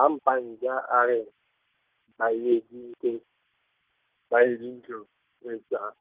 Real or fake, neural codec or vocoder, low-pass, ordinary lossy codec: real; none; 3.6 kHz; Opus, 16 kbps